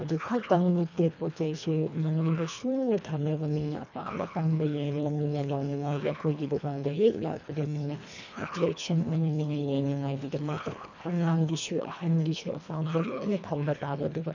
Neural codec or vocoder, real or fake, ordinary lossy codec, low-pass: codec, 24 kHz, 1.5 kbps, HILCodec; fake; none; 7.2 kHz